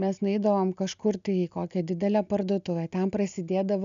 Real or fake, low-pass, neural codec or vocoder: real; 7.2 kHz; none